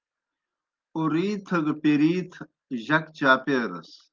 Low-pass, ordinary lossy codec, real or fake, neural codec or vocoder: 7.2 kHz; Opus, 32 kbps; real; none